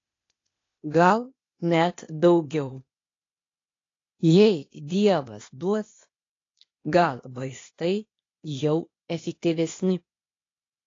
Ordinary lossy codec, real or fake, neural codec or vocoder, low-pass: AAC, 32 kbps; fake; codec, 16 kHz, 0.8 kbps, ZipCodec; 7.2 kHz